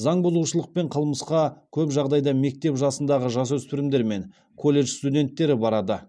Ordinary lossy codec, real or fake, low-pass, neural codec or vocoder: none; real; none; none